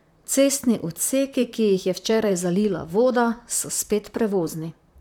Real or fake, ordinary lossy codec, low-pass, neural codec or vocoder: fake; none; 19.8 kHz; vocoder, 44.1 kHz, 128 mel bands, Pupu-Vocoder